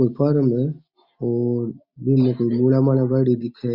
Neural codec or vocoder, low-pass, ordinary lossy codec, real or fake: none; 5.4 kHz; Opus, 64 kbps; real